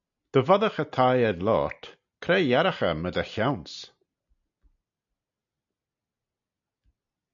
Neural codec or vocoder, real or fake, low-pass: none; real; 7.2 kHz